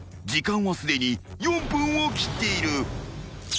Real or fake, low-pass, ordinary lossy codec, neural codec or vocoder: real; none; none; none